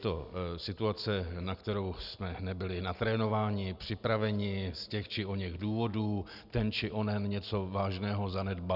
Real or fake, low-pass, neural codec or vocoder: real; 5.4 kHz; none